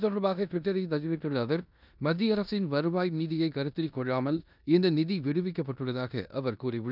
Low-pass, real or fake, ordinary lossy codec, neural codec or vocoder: 5.4 kHz; fake; none; codec, 16 kHz in and 24 kHz out, 0.9 kbps, LongCat-Audio-Codec, four codebook decoder